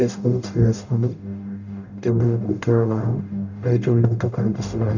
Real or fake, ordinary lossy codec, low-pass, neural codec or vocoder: fake; none; 7.2 kHz; codec, 44.1 kHz, 0.9 kbps, DAC